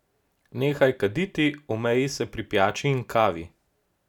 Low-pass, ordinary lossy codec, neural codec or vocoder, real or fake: 19.8 kHz; none; none; real